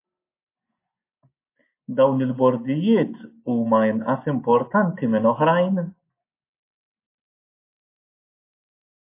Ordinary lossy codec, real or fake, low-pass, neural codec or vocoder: MP3, 24 kbps; real; 3.6 kHz; none